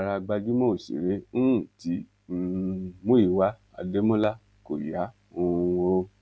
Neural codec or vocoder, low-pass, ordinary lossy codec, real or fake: none; none; none; real